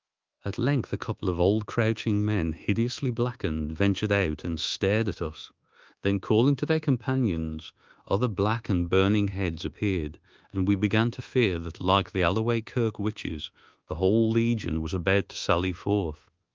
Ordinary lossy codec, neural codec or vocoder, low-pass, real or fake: Opus, 32 kbps; codec, 24 kHz, 1.2 kbps, DualCodec; 7.2 kHz; fake